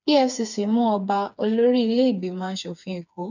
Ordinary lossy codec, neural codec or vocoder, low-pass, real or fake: none; codec, 16 kHz, 4 kbps, FreqCodec, smaller model; 7.2 kHz; fake